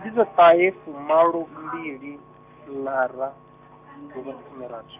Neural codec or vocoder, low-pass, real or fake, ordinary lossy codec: none; 3.6 kHz; real; none